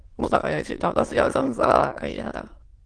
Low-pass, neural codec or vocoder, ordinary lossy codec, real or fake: 9.9 kHz; autoencoder, 22.05 kHz, a latent of 192 numbers a frame, VITS, trained on many speakers; Opus, 16 kbps; fake